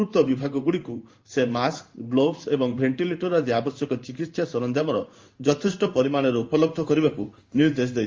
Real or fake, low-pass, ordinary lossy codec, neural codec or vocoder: fake; 7.2 kHz; Opus, 32 kbps; codec, 16 kHz in and 24 kHz out, 1 kbps, XY-Tokenizer